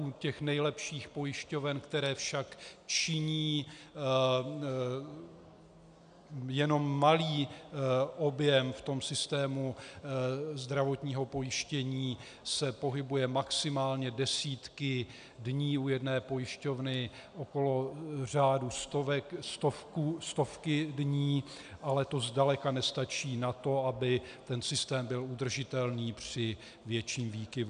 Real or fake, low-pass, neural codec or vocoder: real; 9.9 kHz; none